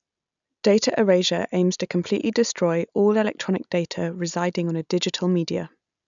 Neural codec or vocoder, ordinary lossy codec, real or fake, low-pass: none; none; real; 7.2 kHz